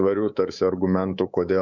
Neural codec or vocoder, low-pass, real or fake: codec, 24 kHz, 3.1 kbps, DualCodec; 7.2 kHz; fake